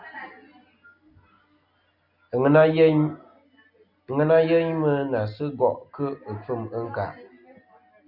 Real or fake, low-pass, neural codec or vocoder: real; 5.4 kHz; none